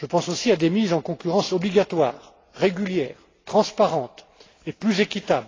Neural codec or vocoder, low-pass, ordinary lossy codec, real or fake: none; 7.2 kHz; AAC, 32 kbps; real